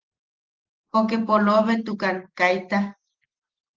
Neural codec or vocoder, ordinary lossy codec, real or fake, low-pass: none; Opus, 16 kbps; real; 7.2 kHz